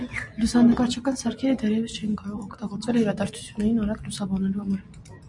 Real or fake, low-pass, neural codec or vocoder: real; 10.8 kHz; none